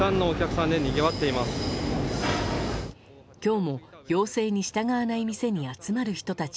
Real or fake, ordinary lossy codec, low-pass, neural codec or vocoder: real; none; none; none